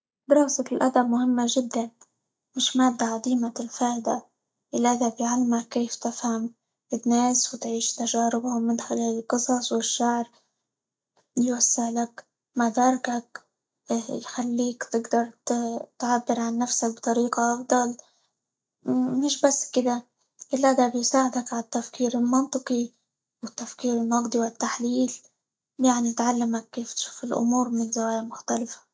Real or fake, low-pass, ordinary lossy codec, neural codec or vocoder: real; none; none; none